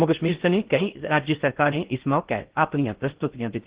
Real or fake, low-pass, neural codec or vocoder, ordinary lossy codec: fake; 3.6 kHz; codec, 16 kHz in and 24 kHz out, 0.6 kbps, FocalCodec, streaming, 2048 codes; Opus, 16 kbps